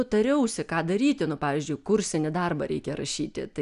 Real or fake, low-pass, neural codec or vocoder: real; 10.8 kHz; none